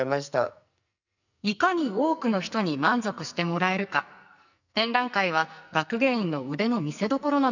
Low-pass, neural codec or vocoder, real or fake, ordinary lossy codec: 7.2 kHz; codec, 44.1 kHz, 2.6 kbps, SNAC; fake; none